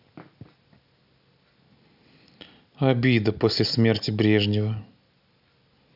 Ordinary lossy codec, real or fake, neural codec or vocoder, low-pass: none; real; none; 5.4 kHz